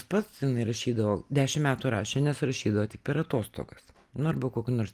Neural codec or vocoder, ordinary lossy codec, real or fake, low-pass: none; Opus, 32 kbps; real; 14.4 kHz